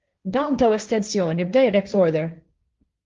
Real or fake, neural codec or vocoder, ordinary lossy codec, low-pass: fake; codec, 16 kHz, 1.1 kbps, Voila-Tokenizer; Opus, 32 kbps; 7.2 kHz